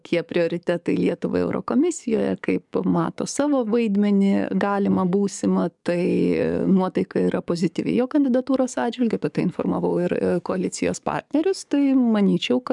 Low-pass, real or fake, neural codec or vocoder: 10.8 kHz; fake; codec, 44.1 kHz, 7.8 kbps, DAC